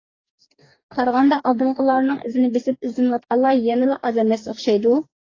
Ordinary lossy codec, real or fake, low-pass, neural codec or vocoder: AAC, 32 kbps; fake; 7.2 kHz; codec, 44.1 kHz, 2.6 kbps, DAC